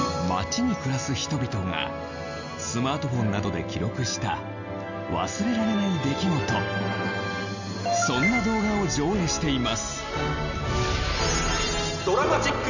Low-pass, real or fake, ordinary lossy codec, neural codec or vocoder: 7.2 kHz; real; none; none